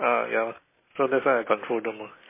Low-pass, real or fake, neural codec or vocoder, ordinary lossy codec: 3.6 kHz; real; none; MP3, 16 kbps